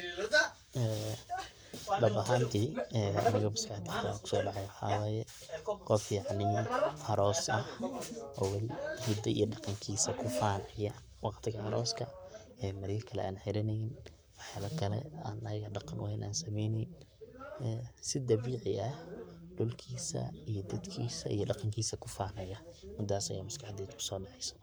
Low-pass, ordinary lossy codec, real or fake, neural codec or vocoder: none; none; fake; codec, 44.1 kHz, 7.8 kbps, Pupu-Codec